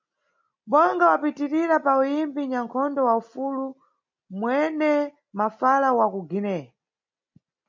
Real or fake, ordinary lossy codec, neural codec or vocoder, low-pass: real; MP3, 48 kbps; none; 7.2 kHz